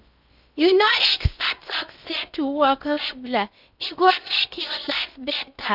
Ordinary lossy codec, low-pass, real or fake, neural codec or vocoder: none; 5.4 kHz; fake; codec, 16 kHz in and 24 kHz out, 0.6 kbps, FocalCodec, streaming, 4096 codes